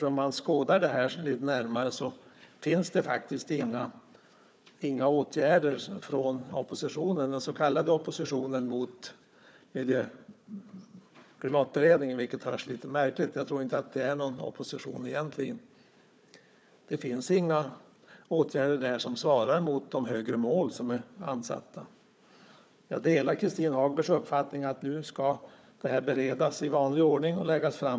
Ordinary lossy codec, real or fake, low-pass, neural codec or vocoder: none; fake; none; codec, 16 kHz, 4 kbps, FunCodec, trained on Chinese and English, 50 frames a second